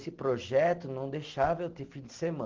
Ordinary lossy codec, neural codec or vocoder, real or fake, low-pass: Opus, 16 kbps; none; real; 7.2 kHz